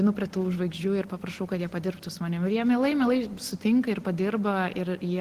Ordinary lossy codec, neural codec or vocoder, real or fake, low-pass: Opus, 16 kbps; none; real; 14.4 kHz